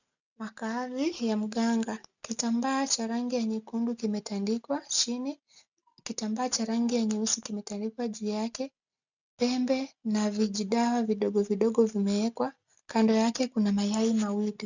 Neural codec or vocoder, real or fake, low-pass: none; real; 7.2 kHz